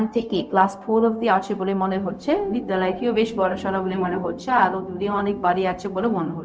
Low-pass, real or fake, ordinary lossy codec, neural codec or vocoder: none; fake; none; codec, 16 kHz, 0.4 kbps, LongCat-Audio-Codec